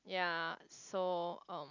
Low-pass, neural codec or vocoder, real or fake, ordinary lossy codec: 7.2 kHz; none; real; none